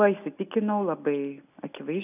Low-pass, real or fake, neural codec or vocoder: 3.6 kHz; real; none